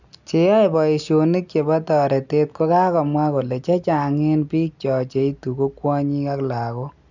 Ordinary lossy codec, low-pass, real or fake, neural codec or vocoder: none; 7.2 kHz; real; none